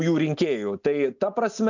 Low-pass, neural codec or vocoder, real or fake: 7.2 kHz; none; real